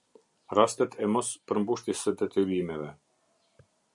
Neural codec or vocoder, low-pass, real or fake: none; 10.8 kHz; real